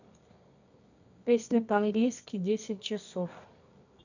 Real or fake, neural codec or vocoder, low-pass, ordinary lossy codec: fake; codec, 24 kHz, 0.9 kbps, WavTokenizer, medium music audio release; 7.2 kHz; none